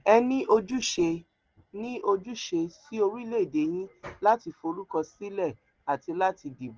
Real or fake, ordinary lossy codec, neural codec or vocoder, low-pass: real; Opus, 16 kbps; none; 7.2 kHz